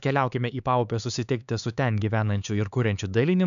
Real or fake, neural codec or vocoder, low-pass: fake; codec, 16 kHz, 4 kbps, X-Codec, HuBERT features, trained on LibriSpeech; 7.2 kHz